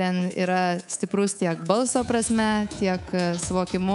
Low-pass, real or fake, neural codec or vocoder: 10.8 kHz; fake; codec, 24 kHz, 3.1 kbps, DualCodec